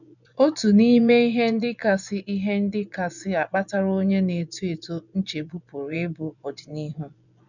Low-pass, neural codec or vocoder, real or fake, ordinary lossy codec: 7.2 kHz; vocoder, 24 kHz, 100 mel bands, Vocos; fake; none